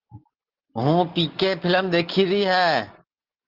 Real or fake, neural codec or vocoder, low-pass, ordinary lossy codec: real; none; 5.4 kHz; Opus, 16 kbps